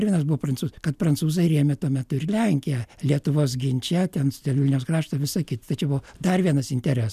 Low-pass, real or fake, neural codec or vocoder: 14.4 kHz; real; none